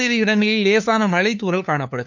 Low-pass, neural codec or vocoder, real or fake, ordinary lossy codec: 7.2 kHz; codec, 16 kHz, 2 kbps, FunCodec, trained on LibriTTS, 25 frames a second; fake; none